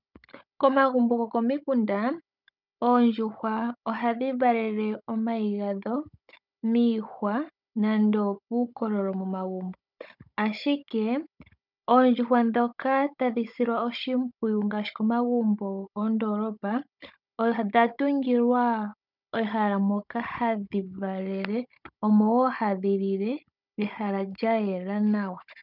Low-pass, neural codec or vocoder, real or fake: 5.4 kHz; codec, 16 kHz, 16 kbps, FunCodec, trained on Chinese and English, 50 frames a second; fake